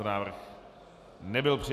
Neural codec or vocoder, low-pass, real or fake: none; 14.4 kHz; real